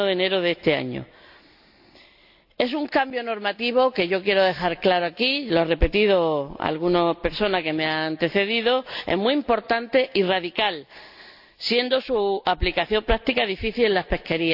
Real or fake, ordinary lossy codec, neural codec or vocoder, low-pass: real; AAC, 48 kbps; none; 5.4 kHz